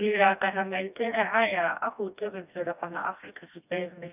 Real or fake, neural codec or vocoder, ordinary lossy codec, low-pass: fake; codec, 16 kHz, 1 kbps, FreqCodec, smaller model; none; 3.6 kHz